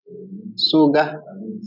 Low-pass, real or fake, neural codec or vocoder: 5.4 kHz; real; none